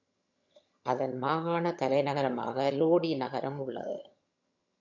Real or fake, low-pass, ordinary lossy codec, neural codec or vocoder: fake; 7.2 kHz; MP3, 48 kbps; vocoder, 22.05 kHz, 80 mel bands, HiFi-GAN